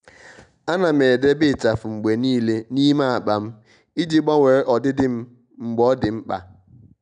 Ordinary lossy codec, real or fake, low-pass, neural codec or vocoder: none; real; 9.9 kHz; none